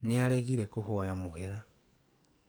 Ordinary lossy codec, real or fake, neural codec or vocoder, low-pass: none; fake; codec, 44.1 kHz, 2.6 kbps, SNAC; none